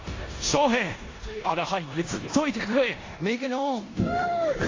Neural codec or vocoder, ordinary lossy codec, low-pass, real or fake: codec, 16 kHz in and 24 kHz out, 0.9 kbps, LongCat-Audio-Codec, four codebook decoder; AAC, 32 kbps; 7.2 kHz; fake